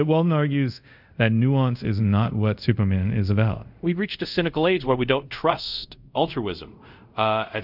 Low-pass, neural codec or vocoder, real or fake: 5.4 kHz; codec, 24 kHz, 0.5 kbps, DualCodec; fake